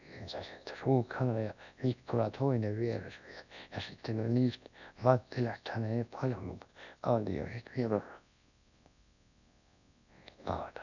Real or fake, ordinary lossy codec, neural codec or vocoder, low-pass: fake; none; codec, 24 kHz, 0.9 kbps, WavTokenizer, large speech release; 7.2 kHz